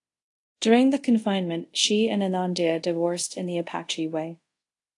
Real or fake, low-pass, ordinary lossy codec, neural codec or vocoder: fake; 10.8 kHz; AAC, 48 kbps; codec, 24 kHz, 0.5 kbps, DualCodec